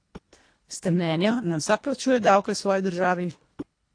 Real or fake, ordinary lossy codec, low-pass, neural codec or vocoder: fake; AAC, 48 kbps; 9.9 kHz; codec, 24 kHz, 1.5 kbps, HILCodec